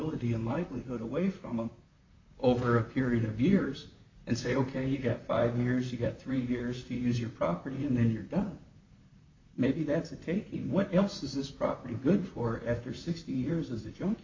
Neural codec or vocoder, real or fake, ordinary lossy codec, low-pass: vocoder, 44.1 kHz, 128 mel bands, Pupu-Vocoder; fake; MP3, 48 kbps; 7.2 kHz